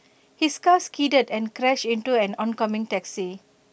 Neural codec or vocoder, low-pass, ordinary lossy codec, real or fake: none; none; none; real